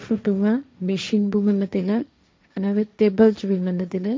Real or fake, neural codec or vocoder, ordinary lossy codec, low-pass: fake; codec, 16 kHz, 1.1 kbps, Voila-Tokenizer; none; none